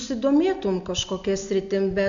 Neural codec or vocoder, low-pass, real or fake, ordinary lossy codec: none; 7.2 kHz; real; AAC, 64 kbps